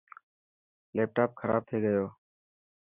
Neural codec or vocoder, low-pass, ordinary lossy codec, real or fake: none; 3.6 kHz; Opus, 64 kbps; real